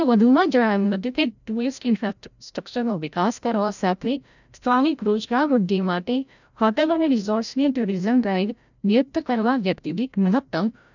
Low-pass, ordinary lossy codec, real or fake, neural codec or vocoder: 7.2 kHz; none; fake; codec, 16 kHz, 0.5 kbps, FreqCodec, larger model